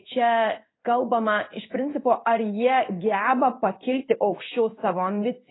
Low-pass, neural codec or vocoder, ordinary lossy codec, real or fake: 7.2 kHz; codec, 16 kHz in and 24 kHz out, 1 kbps, XY-Tokenizer; AAC, 16 kbps; fake